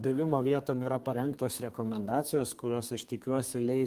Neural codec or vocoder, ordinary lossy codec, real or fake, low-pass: codec, 32 kHz, 1.9 kbps, SNAC; Opus, 32 kbps; fake; 14.4 kHz